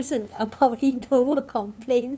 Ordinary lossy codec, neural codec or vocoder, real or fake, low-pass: none; codec, 16 kHz, 2 kbps, FunCodec, trained on LibriTTS, 25 frames a second; fake; none